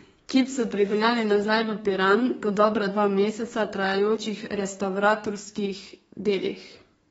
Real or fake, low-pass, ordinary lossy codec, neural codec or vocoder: fake; 14.4 kHz; AAC, 24 kbps; codec, 32 kHz, 1.9 kbps, SNAC